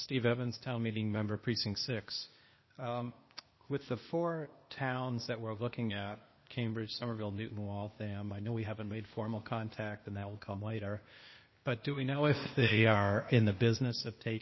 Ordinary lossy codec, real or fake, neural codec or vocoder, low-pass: MP3, 24 kbps; fake; codec, 16 kHz, 0.8 kbps, ZipCodec; 7.2 kHz